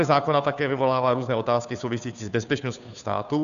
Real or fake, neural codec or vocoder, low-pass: fake; codec, 16 kHz, 2 kbps, FunCodec, trained on Chinese and English, 25 frames a second; 7.2 kHz